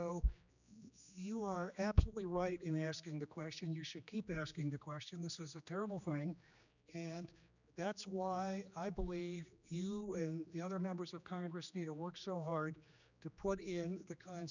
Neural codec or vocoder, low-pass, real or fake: codec, 16 kHz, 2 kbps, X-Codec, HuBERT features, trained on general audio; 7.2 kHz; fake